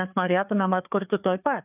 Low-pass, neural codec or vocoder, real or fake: 3.6 kHz; codec, 16 kHz, 4 kbps, FreqCodec, larger model; fake